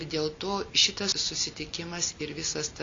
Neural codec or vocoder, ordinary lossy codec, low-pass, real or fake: none; MP3, 48 kbps; 7.2 kHz; real